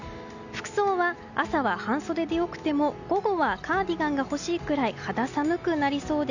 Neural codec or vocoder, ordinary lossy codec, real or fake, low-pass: none; none; real; 7.2 kHz